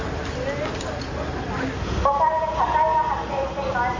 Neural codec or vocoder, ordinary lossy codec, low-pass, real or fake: none; MP3, 48 kbps; 7.2 kHz; real